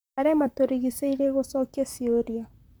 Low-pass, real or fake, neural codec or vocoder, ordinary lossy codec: none; fake; vocoder, 44.1 kHz, 128 mel bands, Pupu-Vocoder; none